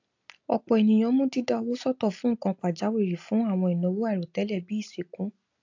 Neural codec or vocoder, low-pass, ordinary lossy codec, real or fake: none; 7.2 kHz; AAC, 48 kbps; real